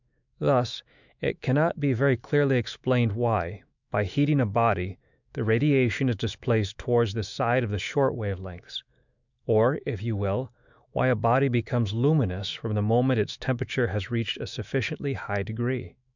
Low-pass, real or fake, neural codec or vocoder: 7.2 kHz; fake; autoencoder, 48 kHz, 128 numbers a frame, DAC-VAE, trained on Japanese speech